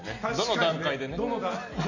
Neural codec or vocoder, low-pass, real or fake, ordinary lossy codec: none; 7.2 kHz; real; none